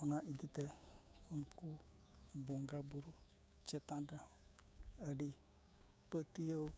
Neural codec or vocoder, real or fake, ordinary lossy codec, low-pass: codec, 16 kHz, 6 kbps, DAC; fake; none; none